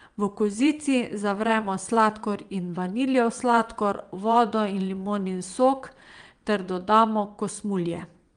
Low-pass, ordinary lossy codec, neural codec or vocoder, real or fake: 9.9 kHz; Opus, 32 kbps; vocoder, 22.05 kHz, 80 mel bands, WaveNeXt; fake